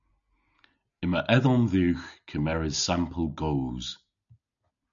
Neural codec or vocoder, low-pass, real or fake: none; 7.2 kHz; real